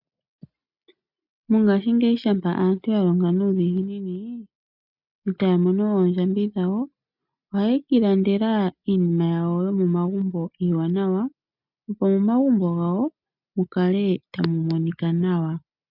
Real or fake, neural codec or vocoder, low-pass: real; none; 5.4 kHz